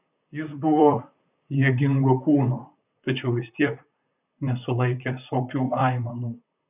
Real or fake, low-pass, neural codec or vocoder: fake; 3.6 kHz; vocoder, 44.1 kHz, 128 mel bands, Pupu-Vocoder